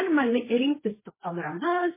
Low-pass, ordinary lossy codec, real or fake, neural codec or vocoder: 3.6 kHz; MP3, 16 kbps; fake; codec, 16 kHz, 1.1 kbps, Voila-Tokenizer